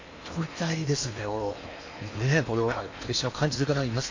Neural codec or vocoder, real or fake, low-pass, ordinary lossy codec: codec, 16 kHz in and 24 kHz out, 0.8 kbps, FocalCodec, streaming, 65536 codes; fake; 7.2 kHz; AAC, 48 kbps